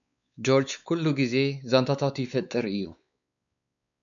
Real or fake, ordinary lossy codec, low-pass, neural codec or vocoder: fake; MP3, 96 kbps; 7.2 kHz; codec, 16 kHz, 4 kbps, X-Codec, WavLM features, trained on Multilingual LibriSpeech